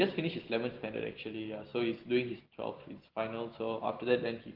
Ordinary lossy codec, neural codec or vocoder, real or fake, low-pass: Opus, 16 kbps; none; real; 5.4 kHz